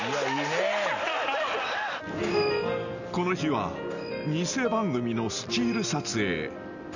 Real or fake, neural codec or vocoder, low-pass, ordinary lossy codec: real; none; 7.2 kHz; none